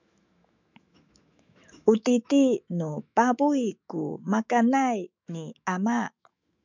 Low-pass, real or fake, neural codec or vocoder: 7.2 kHz; fake; autoencoder, 48 kHz, 128 numbers a frame, DAC-VAE, trained on Japanese speech